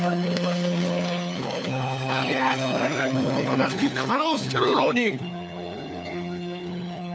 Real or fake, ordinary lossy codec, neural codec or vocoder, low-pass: fake; none; codec, 16 kHz, 4 kbps, FunCodec, trained on LibriTTS, 50 frames a second; none